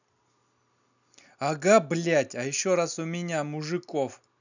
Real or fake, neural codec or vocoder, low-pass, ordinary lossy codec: real; none; 7.2 kHz; none